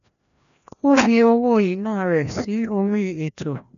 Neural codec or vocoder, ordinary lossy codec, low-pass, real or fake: codec, 16 kHz, 1 kbps, FreqCodec, larger model; none; 7.2 kHz; fake